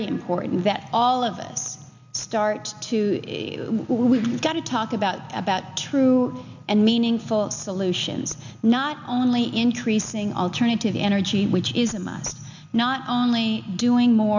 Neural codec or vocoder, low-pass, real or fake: none; 7.2 kHz; real